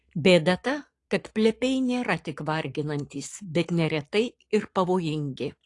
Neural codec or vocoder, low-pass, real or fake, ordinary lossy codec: codec, 44.1 kHz, 7.8 kbps, Pupu-Codec; 10.8 kHz; fake; AAC, 48 kbps